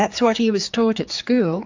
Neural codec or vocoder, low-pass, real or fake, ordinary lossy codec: codec, 16 kHz, 4 kbps, X-Codec, HuBERT features, trained on general audio; 7.2 kHz; fake; AAC, 48 kbps